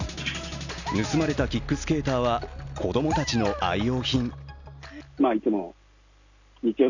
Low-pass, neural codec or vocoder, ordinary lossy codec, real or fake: 7.2 kHz; none; none; real